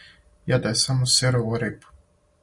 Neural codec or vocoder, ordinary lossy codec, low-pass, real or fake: none; Opus, 64 kbps; 10.8 kHz; real